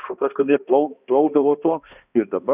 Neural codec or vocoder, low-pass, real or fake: codec, 16 kHz, 1 kbps, X-Codec, HuBERT features, trained on balanced general audio; 3.6 kHz; fake